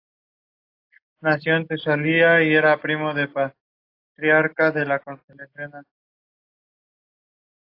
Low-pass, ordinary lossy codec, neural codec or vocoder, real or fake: 5.4 kHz; AAC, 32 kbps; none; real